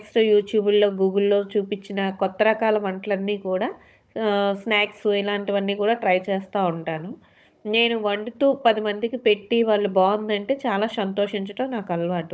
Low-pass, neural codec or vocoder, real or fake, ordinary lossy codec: none; codec, 16 kHz, 16 kbps, FunCodec, trained on Chinese and English, 50 frames a second; fake; none